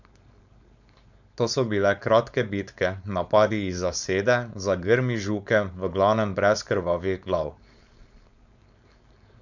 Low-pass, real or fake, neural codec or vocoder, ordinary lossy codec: 7.2 kHz; fake; codec, 16 kHz, 4.8 kbps, FACodec; none